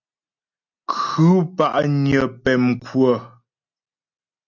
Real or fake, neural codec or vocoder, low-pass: real; none; 7.2 kHz